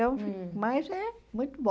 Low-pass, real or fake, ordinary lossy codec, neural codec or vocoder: none; real; none; none